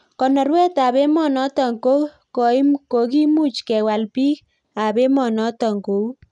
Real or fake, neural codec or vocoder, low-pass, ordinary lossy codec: real; none; 10.8 kHz; none